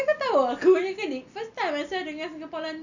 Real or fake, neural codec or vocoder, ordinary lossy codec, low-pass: real; none; none; 7.2 kHz